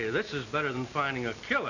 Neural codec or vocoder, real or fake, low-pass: none; real; 7.2 kHz